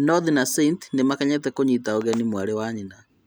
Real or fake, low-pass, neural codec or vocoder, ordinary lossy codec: real; none; none; none